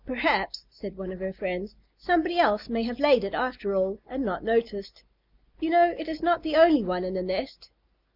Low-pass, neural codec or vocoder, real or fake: 5.4 kHz; none; real